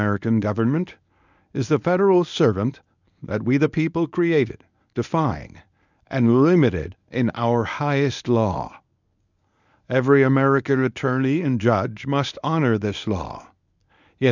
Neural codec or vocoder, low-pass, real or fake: codec, 24 kHz, 0.9 kbps, WavTokenizer, medium speech release version 1; 7.2 kHz; fake